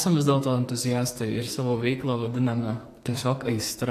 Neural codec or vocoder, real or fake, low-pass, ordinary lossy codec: codec, 44.1 kHz, 2.6 kbps, SNAC; fake; 14.4 kHz; AAC, 64 kbps